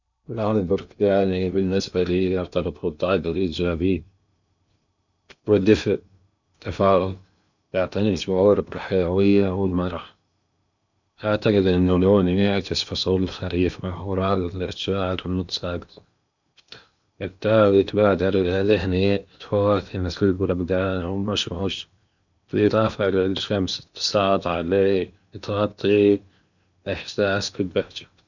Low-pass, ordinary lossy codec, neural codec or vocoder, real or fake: 7.2 kHz; none; codec, 16 kHz in and 24 kHz out, 0.8 kbps, FocalCodec, streaming, 65536 codes; fake